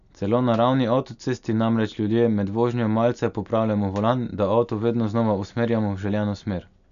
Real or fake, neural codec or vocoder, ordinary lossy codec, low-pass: real; none; none; 7.2 kHz